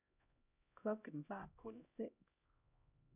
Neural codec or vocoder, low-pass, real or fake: codec, 16 kHz, 0.5 kbps, X-Codec, HuBERT features, trained on LibriSpeech; 3.6 kHz; fake